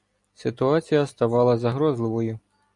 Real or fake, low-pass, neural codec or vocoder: real; 10.8 kHz; none